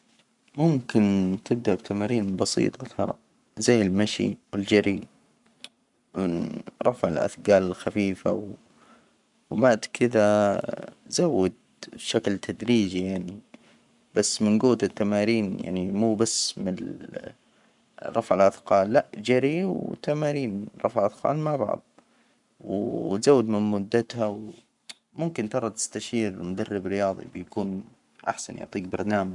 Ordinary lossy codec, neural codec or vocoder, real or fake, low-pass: none; codec, 44.1 kHz, 7.8 kbps, Pupu-Codec; fake; 10.8 kHz